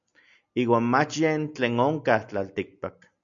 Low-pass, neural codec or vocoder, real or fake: 7.2 kHz; none; real